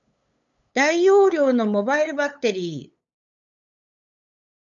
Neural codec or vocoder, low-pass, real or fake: codec, 16 kHz, 8 kbps, FunCodec, trained on LibriTTS, 25 frames a second; 7.2 kHz; fake